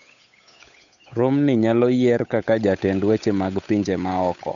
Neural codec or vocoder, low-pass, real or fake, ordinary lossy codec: codec, 16 kHz, 8 kbps, FunCodec, trained on Chinese and English, 25 frames a second; 7.2 kHz; fake; none